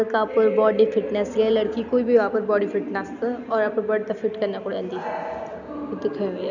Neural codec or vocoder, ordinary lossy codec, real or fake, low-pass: none; none; real; 7.2 kHz